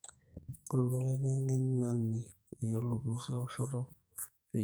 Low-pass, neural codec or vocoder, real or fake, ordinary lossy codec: none; codec, 44.1 kHz, 2.6 kbps, SNAC; fake; none